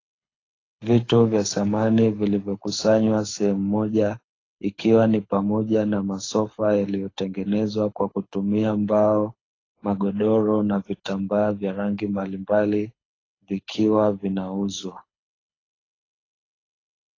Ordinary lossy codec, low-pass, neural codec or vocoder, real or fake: AAC, 32 kbps; 7.2 kHz; codec, 24 kHz, 6 kbps, HILCodec; fake